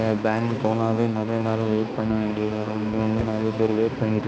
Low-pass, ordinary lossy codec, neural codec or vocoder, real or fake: none; none; codec, 16 kHz, 2 kbps, X-Codec, HuBERT features, trained on balanced general audio; fake